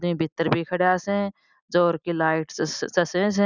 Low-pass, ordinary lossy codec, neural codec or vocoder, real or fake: 7.2 kHz; none; none; real